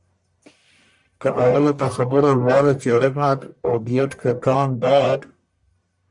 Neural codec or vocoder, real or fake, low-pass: codec, 44.1 kHz, 1.7 kbps, Pupu-Codec; fake; 10.8 kHz